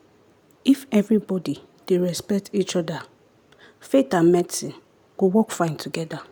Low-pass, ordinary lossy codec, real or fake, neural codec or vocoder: none; none; real; none